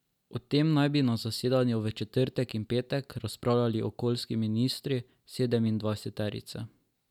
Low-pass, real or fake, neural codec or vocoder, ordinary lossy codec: 19.8 kHz; real; none; none